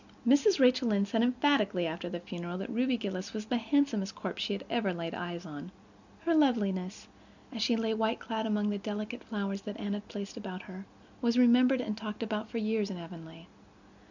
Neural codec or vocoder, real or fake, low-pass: none; real; 7.2 kHz